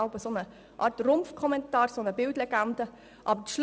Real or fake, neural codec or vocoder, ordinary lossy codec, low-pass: real; none; none; none